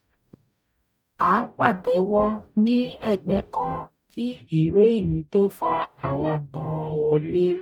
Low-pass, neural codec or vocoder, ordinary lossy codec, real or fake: 19.8 kHz; codec, 44.1 kHz, 0.9 kbps, DAC; none; fake